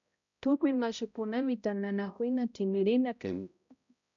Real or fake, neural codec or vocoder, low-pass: fake; codec, 16 kHz, 0.5 kbps, X-Codec, HuBERT features, trained on balanced general audio; 7.2 kHz